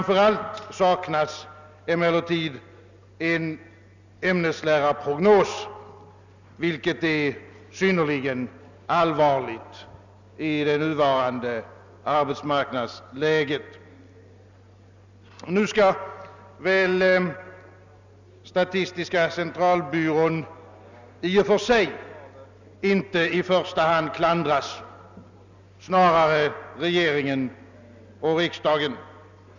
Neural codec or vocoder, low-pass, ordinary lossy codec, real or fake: none; 7.2 kHz; none; real